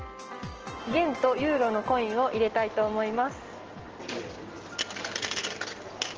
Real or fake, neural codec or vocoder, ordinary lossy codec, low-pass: real; none; Opus, 16 kbps; 7.2 kHz